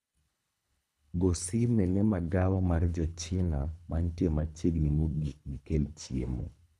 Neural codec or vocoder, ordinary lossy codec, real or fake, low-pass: codec, 24 kHz, 3 kbps, HILCodec; none; fake; none